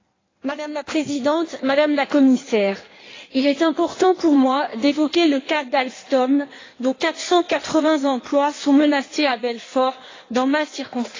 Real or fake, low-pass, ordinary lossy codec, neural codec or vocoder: fake; 7.2 kHz; AAC, 32 kbps; codec, 16 kHz in and 24 kHz out, 1.1 kbps, FireRedTTS-2 codec